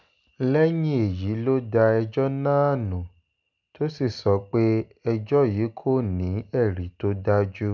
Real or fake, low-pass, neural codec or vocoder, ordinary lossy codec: real; 7.2 kHz; none; none